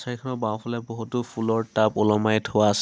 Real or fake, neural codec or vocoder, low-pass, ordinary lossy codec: real; none; none; none